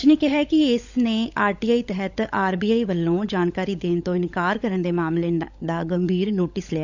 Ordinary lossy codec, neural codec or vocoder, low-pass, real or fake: none; codec, 16 kHz, 16 kbps, FunCodec, trained on LibriTTS, 50 frames a second; 7.2 kHz; fake